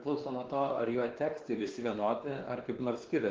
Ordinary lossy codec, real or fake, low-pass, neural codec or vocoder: Opus, 16 kbps; fake; 7.2 kHz; codec, 16 kHz, 2 kbps, X-Codec, WavLM features, trained on Multilingual LibriSpeech